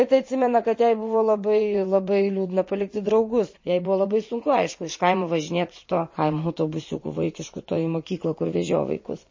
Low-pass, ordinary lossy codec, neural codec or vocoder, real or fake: 7.2 kHz; MP3, 32 kbps; vocoder, 44.1 kHz, 80 mel bands, Vocos; fake